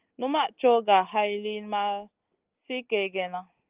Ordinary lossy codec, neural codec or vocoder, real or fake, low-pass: Opus, 24 kbps; none; real; 3.6 kHz